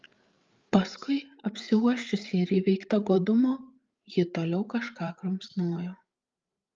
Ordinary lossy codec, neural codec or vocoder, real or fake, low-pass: Opus, 32 kbps; codec, 16 kHz, 8 kbps, FreqCodec, larger model; fake; 7.2 kHz